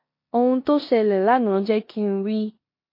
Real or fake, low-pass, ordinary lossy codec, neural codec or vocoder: fake; 5.4 kHz; MP3, 32 kbps; codec, 16 kHz in and 24 kHz out, 0.9 kbps, LongCat-Audio-Codec, four codebook decoder